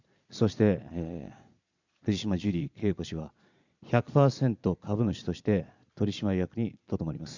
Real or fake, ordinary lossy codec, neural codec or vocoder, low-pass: fake; none; vocoder, 22.05 kHz, 80 mel bands, Vocos; 7.2 kHz